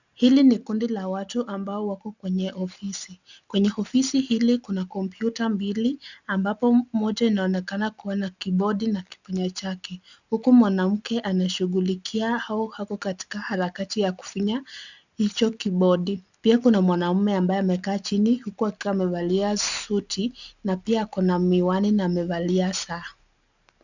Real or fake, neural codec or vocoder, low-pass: real; none; 7.2 kHz